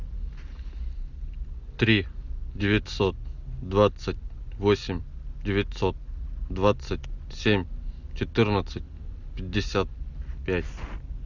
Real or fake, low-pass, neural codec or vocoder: real; 7.2 kHz; none